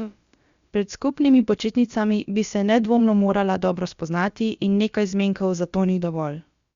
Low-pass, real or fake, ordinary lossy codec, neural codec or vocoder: 7.2 kHz; fake; Opus, 64 kbps; codec, 16 kHz, about 1 kbps, DyCAST, with the encoder's durations